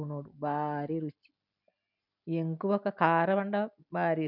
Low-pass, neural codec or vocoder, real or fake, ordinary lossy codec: 5.4 kHz; none; real; none